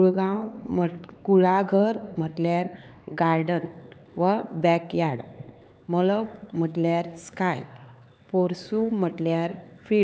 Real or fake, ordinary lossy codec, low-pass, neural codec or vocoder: fake; none; none; codec, 16 kHz, 4 kbps, X-Codec, HuBERT features, trained on LibriSpeech